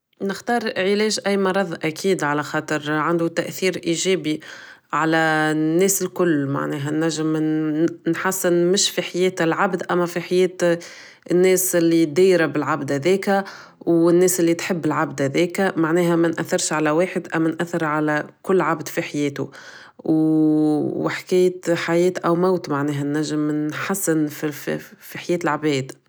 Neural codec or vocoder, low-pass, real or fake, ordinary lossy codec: none; none; real; none